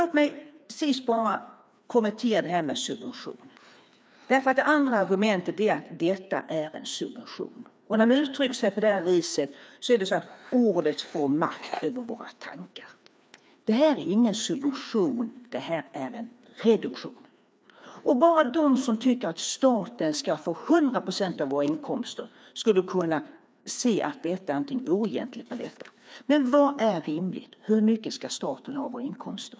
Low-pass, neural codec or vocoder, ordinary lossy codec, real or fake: none; codec, 16 kHz, 2 kbps, FreqCodec, larger model; none; fake